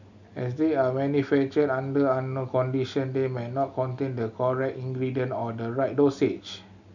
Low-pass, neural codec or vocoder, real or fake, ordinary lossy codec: 7.2 kHz; none; real; none